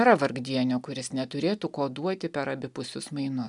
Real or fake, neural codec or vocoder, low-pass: real; none; 10.8 kHz